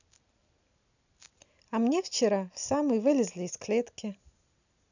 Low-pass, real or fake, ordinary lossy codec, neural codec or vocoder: 7.2 kHz; real; none; none